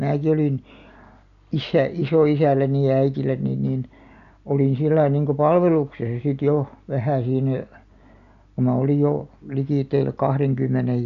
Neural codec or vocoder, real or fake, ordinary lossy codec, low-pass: none; real; none; 7.2 kHz